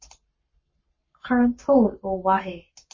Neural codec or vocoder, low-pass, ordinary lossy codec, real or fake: codec, 44.1 kHz, 7.8 kbps, Pupu-Codec; 7.2 kHz; MP3, 32 kbps; fake